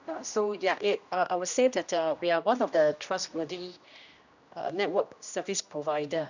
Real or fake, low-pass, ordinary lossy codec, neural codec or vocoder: fake; 7.2 kHz; none; codec, 16 kHz, 1 kbps, X-Codec, HuBERT features, trained on general audio